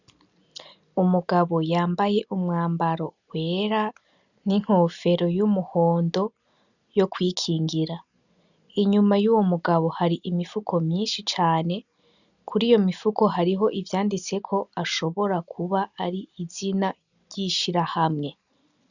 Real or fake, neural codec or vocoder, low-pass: real; none; 7.2 kHz